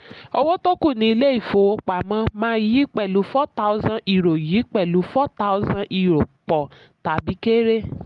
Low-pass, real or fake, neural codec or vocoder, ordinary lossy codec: 10.8 kHz; real; none; Opus, 32 kbps